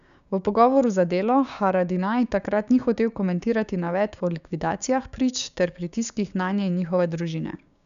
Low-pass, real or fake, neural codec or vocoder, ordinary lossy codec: 7.2 kHz; fake; codec, 16 kHz, 6 kbps, DAC; none